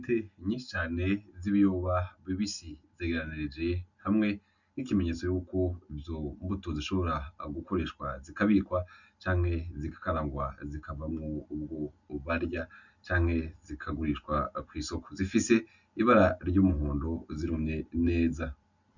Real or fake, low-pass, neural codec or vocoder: real; 7.2 kHz; none